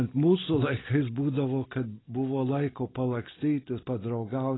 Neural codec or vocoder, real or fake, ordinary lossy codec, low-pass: none; real; AAC, 16 kbps; 7.2 kHz